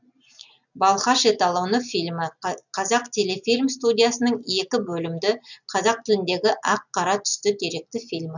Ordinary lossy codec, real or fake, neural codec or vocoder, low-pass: none; real; none; 7.2 kHz